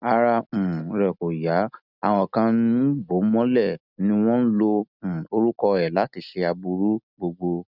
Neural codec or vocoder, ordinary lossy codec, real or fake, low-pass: none; none; real; 5.4 kHz